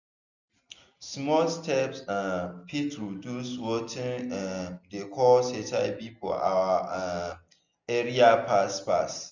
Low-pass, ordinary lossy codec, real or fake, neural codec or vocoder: 7.2 kHz; none; real; none